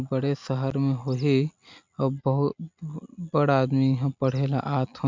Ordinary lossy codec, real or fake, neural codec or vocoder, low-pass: MP3, 64 kbps; real; none; 7.2 kHz